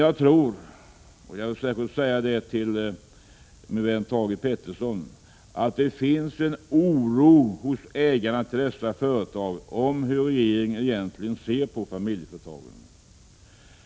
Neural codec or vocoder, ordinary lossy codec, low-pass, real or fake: none; none; none; real